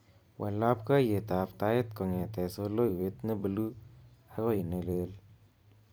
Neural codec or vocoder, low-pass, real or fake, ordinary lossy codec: vocoder, 44.1 kHz, 128 mel bands every 256 samples, BigVGAN v2; none; fake; none